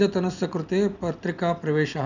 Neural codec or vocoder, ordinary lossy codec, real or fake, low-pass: none; none; real; 7.2 kHz